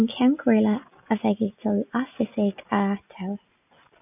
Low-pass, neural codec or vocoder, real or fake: 3.6 kHz; none; real